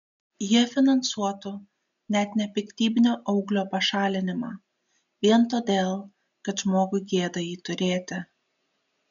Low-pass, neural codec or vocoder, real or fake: 7.2 kHz; none; real